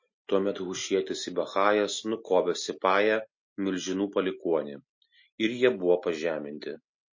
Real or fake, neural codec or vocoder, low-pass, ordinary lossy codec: real; none; 7.2 kHz; MP3, 32 kbps